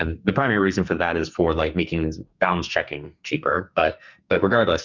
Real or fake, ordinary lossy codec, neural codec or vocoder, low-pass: fake; Opus, 64 kbps; codec, 44.1 kHz, 2.6 kbps, SNAC; 7.2 kHz